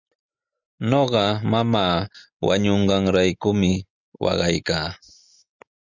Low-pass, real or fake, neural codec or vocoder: 7.2 kHz; real; none